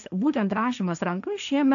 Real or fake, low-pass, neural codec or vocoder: fake; 7.2 kHz; codec, 16 kHz, 1.1 kbps, Voila-Tokenizer